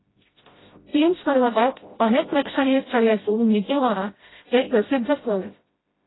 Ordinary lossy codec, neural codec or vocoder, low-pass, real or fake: AAC, 16 kbps; codec, 16 kHz, 0.5 kbps, FreqCodec, smaller model; 7.2 kHz; fake